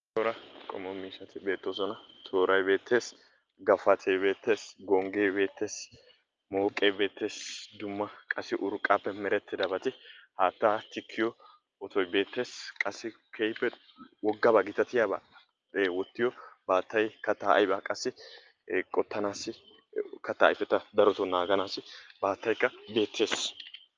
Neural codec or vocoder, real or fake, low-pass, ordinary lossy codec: none; real; 7.2 kHz; Opus, 24 kbps